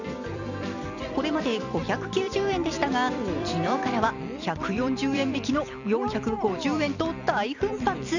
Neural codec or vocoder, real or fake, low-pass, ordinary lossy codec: vocoder, 44.1 kHz, 128 mel bands every 256 samples, BigVGAN v2; fake; 7.2 kHz; none